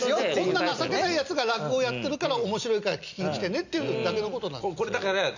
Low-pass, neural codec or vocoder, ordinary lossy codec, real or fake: 7.2 kHz; none; none; real